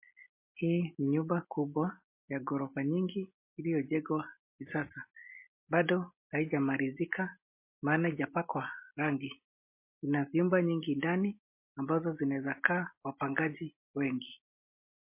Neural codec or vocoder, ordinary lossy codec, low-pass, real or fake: none; MP3, 24 kbps; 3.6 kHz; real